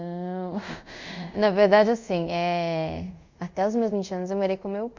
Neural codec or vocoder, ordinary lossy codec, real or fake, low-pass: codec, 24 kHz, 0.5 kbps, DualCodec; MP3, 64 kbps; fake; 7.2 kHz